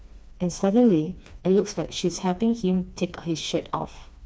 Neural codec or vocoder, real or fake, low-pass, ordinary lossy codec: codec, 16 kHz, 2 kbps, FreqCodec, smaller model; fake; none; none